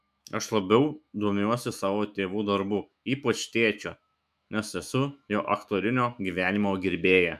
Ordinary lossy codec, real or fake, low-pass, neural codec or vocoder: MP3, 96 kbps; fake; 14.4 kHz; autoencoder, 48 kHz, 128 numbers a frame, DAC-VAE, trained on Japanese speech